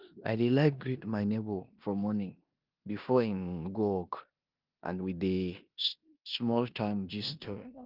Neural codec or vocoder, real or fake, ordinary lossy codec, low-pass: codec, 16 kHz in and 24 kHz out, 0.9 kbps, LongCat-Audio-Codec, four codebook decoder; fake; Opus, 32 kbps; 5.4 kHz